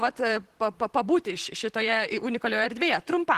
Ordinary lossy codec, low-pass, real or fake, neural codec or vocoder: Opus, 16 kbps; 14.4 kHz; fake; vocoder, 44.1 kHz, 128 mel bands, Pupu-Vocoder